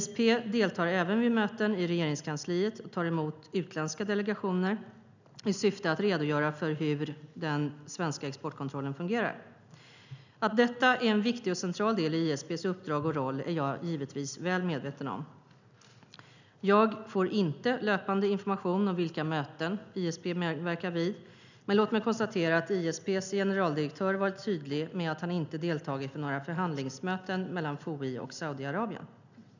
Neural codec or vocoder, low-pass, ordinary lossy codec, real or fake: none; 7.2 kHz; none; real